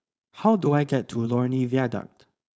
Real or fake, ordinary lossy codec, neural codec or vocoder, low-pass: fake; none; codec, 16 kHz, 4.8 kbps, FACodec; none